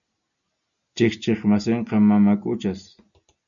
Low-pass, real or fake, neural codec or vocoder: 7.2 kHz; real; none